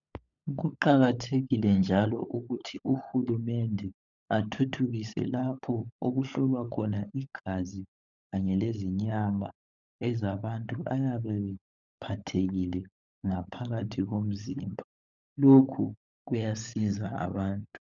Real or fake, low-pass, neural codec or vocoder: fake; 7.2 kHz; codec, 16 kHz, 16 kbps, FunCodec, trained on LibriTTS, 50 frames a second